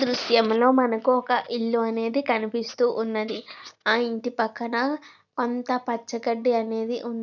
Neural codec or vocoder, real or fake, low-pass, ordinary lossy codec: none; real; 7.2 kHz; none